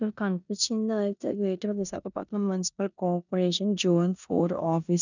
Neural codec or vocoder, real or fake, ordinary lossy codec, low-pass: codec, 16 kHz in and 24 kHz out, 0.9 kbps, LongCat-Audio-Codec, four codebook decoder; fake; none; 7.2 kHz